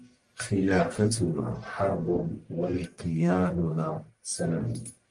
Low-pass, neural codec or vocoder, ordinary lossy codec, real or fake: 10.8 kHz; codec, 44.1 kHz, 1.7 kbps, Pupu-Codec; Opus, 32 kbps; fake